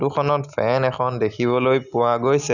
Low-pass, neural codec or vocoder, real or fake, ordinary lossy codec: 7.2 kHz; none; real; none